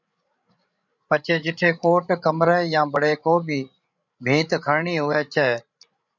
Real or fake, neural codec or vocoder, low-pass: fake; codec, 16 kHz, 16 kbps, FreqCodec, larger model; 7.2 kHz